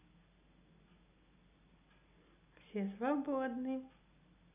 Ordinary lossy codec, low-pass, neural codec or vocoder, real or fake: none; 3.6 kHz; none; real